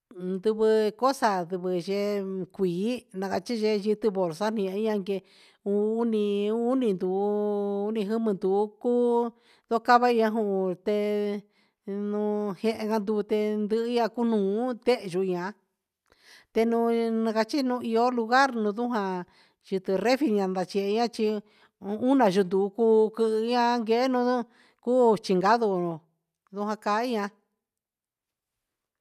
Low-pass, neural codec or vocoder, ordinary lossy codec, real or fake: 14.4 kHz; none; none; real